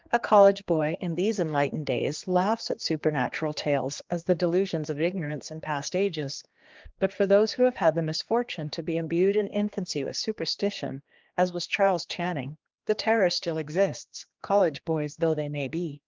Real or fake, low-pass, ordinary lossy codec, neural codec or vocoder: fake; 7.2 kHz; Opus, 32 kbps; codec, 16 kHz, 2 kbps, X-Codec, HuBERT features, trained on general audio